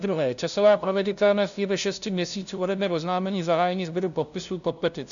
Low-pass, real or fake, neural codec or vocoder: 7.2 kHz; fake; codec, 16 kHz, 0.5 kbps, FunCodec, trained on LibriTTS, 25 frames a second